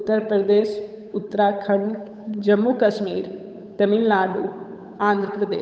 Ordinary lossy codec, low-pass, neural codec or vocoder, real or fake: none; none; codec, 16 kHz, 8 kbps, FunCodec, trained on Chinese and English, 25 frames a second; fake